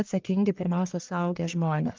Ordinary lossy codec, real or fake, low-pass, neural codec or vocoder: Opus, 32 kbps; fake; 7.2 kHz; codec, 44.1 kHz, 1.7 kbps, Pupu-Codec